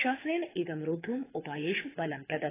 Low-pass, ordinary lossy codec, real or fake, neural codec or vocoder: 3.6 kHz; AAC, 16 kbps; fake; codec, 24 kHz, 6 kbps, HILCodec